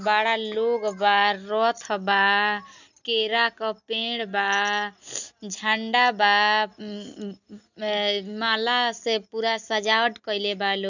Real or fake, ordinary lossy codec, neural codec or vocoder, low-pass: real; none; none; 7.2 kHz